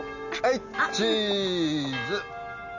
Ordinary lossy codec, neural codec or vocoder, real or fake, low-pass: none; none; real; 7.2 kHz